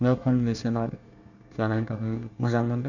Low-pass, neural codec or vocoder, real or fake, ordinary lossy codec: 7.2 kHz; codec, 24 kHz, 1 kbps, SNAC; fake; none